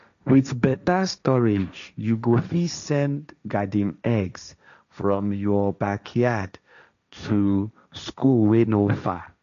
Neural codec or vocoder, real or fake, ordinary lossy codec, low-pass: codec, 16 kHz, 1.1 kbps, Voila-Tokenizer; fake; none; 7.2 kHz